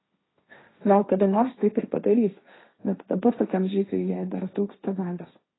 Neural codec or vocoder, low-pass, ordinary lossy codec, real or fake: codec, 16 kHz, 1.1 kbps, Voila-Tokenizer; 7.2 kHz; AAC, 16 kbps; fake